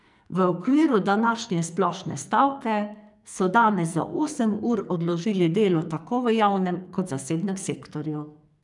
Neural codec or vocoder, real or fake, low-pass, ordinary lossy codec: codec, 44.1 kHz, 2.6 kbps, SNAC; fake; 10.8 kHz; none